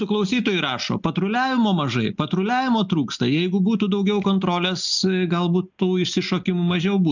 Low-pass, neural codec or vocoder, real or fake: 7.2 kHz; none; real